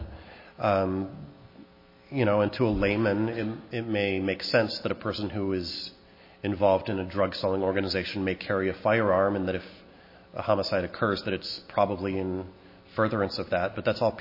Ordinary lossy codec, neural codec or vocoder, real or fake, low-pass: MP3, 24 kbps; none; real; 5.4 kHz